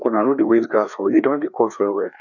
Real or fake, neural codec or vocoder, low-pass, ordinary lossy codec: fake; codec, 16 kHz, 2 kbps, FreqCodec, larger model; 7.2 kHz; none